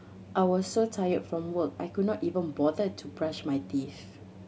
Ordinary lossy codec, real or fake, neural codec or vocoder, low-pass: none; real; none; none